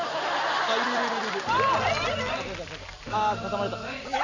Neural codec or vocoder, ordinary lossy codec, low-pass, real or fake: none; none; 7.2 kHz; real